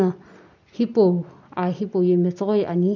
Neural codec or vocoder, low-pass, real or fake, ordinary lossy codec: none; 7.2 kHz; real; none